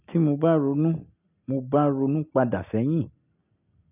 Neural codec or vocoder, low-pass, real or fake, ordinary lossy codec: none; 3.6 kHz; real; none